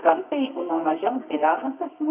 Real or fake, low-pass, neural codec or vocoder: fake; 3.6 kHz; codec, 24 kHz, 0.9 kbps, WavTokenizer, medium music audio release